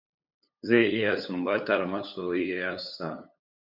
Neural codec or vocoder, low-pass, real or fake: codec, 16 kHz, 8 kbps, FunCodec, trained on LibriTTS, 25 frames a second; 5.4 kHz; fake